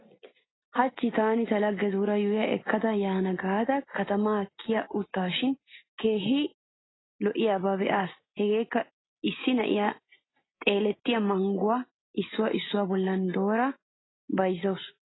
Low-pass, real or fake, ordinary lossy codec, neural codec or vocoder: 7.2 kHz; real; AAC, 16 kbps; none